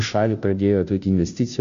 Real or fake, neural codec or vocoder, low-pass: fake; codec, 16 kHz, 0.5 kbps, FunCodec, trained on Chinese and English, 25 frames a second; 7.2 kHz